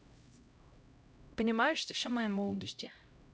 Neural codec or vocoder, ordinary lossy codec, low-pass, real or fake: codec, 16 kHz, 0.5 kbps, X-Codec, HuBERT features, trained on LibriSpeech; none; none; fake